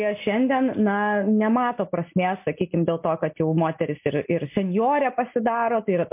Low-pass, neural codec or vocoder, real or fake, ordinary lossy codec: 3.6 kHz; none; real; MP3, 32 kbps